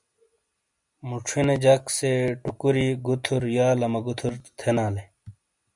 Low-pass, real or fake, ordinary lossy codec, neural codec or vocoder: 10.8 kHz; real; MP3, 96 kbps; none